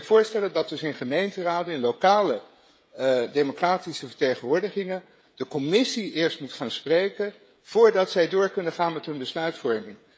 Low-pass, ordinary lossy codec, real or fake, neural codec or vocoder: none; none; fake; codec, 16 kHz, 16 kbps, FreqCodec, smaller model